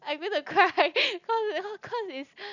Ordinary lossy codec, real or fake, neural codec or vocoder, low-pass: none; real; none; 7.2 kHz